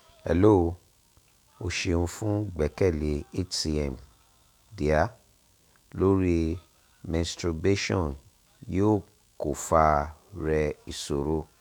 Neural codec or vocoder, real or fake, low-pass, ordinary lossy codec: autoencoder, 48 kHz, 128 numbers a frame, DAC-VAE, trained on Japanese speech; fake; 19.8 kHz; none